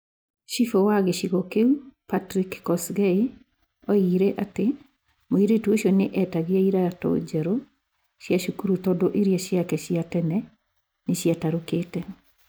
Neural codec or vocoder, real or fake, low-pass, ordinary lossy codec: none; real; none; none